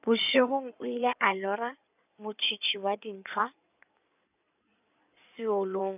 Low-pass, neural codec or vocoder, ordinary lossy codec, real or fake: 3.6 kHz; codec, 16 kHz in and 24 kHz out, 2.2 kbps, FireRedTTS-2 codec; none; fake